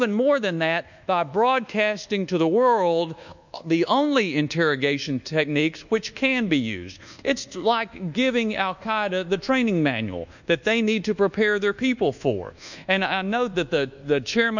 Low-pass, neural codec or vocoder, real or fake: 7.2 kHz; codec, 24 kHz, 1.2 kbps, DualCodec; fake